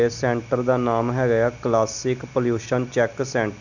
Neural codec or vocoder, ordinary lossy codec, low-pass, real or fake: none; none; 7.2 kHz; real